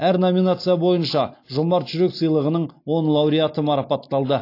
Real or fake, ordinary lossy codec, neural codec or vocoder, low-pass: real; AAC, 32 kbps; none; 5.4 kHz